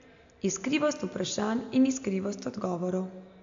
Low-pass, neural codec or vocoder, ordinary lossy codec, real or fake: 7.2 kHz; none; AAC, 64 kbps; real